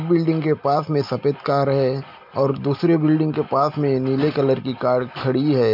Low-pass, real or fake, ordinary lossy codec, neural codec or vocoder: 5.4 kHz; real; MP3, 48 kbps; none